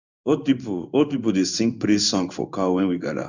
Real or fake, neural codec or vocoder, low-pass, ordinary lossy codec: fake; codec, 16 kHz in and 24 kHz out, 1 kbps, XY-Tokenizer; 7.2 kHz; none